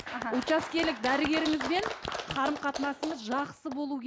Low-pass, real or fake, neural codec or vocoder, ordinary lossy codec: none; real; none; none